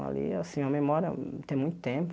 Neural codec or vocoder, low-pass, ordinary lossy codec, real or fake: none; none; none; real